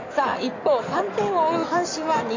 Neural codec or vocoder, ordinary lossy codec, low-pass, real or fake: codec, 16 kHz in and 24 kHz out, 2.2 kbps, FireRedTTS-2 codec; AAC, 48 kbps; 7.2 kHz; fake